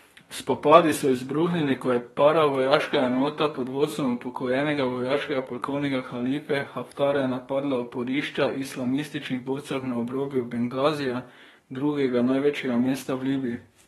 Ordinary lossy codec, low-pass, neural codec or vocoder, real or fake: AAC, 32 kbps; 14.4 kHz; codec, 32 kHz, 1.9 kbps, SNAC; fake